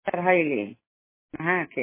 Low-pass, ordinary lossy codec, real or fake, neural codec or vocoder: 3.6 kHz; MP3, 16 kbps; real; none